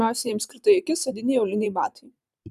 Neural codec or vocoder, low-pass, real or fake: vocoder, 44.1 kHz, 128 mel bands every 512 samples, BigVGAN v2; 14.4 kHz; fake